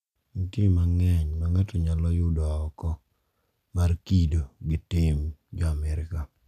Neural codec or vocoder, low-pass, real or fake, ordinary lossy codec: none; 14.4 kHz; real; none